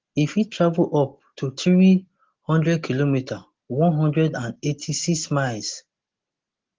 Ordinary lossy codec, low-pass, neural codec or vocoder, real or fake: Opus, 16 kbps; 7.2 kHz; none; real